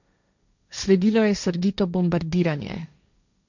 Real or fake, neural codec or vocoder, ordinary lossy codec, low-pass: fake; codec, 16 kHz, 1.1 kbps, Voila-Tokenizer; none; 7.2 kHz